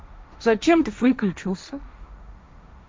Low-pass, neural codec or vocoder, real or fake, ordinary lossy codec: none; codec, 16 kHz, 1.1 kbps, Voila-Tokenizer; fake; none